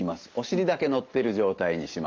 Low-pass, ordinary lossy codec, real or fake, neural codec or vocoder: 7.2 kHz; Opus, 24 kbps; real; none